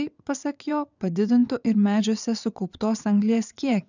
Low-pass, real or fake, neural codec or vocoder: 7.2 kHz; fake; vocoder, 22.05 kHz, 80 mel bands, WaveNeXt